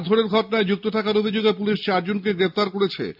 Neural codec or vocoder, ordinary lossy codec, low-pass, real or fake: none; MP3, 48 kbps; 5.4 kHz; real